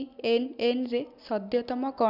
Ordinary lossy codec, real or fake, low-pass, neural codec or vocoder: none; real; 5.4 kHz; none